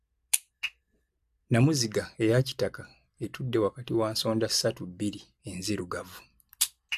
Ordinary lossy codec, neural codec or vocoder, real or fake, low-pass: AAC, 96 kbps; none; real; 14.4 kHz